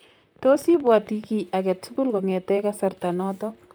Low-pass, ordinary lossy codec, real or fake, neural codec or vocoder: none; none; fake; vocoder, 44.1 kHz, 128 mel bands, Pupu-Vocoder